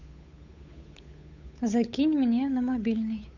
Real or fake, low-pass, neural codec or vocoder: fake; 7.2 kHz; codec, 16 kHz, 8 kbps, FunCodec, trained on Chinese and English, 25 frames a second